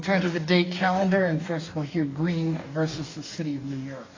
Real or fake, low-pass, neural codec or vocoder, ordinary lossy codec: fake; 7.2 kHz; codec, 44.1 kHz, 2.6 kbps, DAC; AAC, 32 kbps